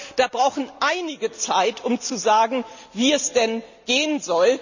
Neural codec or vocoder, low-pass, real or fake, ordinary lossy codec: none; 7.2 kHz; real; none